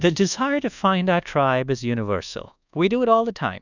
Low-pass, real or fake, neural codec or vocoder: 7.2 kHz; fake; codec, 24 kHz, 1.2 kbps, DualCodec